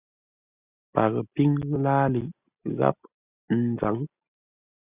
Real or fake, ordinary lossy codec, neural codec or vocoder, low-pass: real; Opus, 64 kbps; none; 3.6 kHz